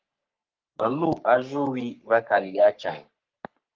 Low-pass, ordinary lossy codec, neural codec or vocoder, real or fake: 7.2 kHz; Opus, 32 kbps; codec, 44.1 kHz, 3.4 kbps, Pupu-Codec; fake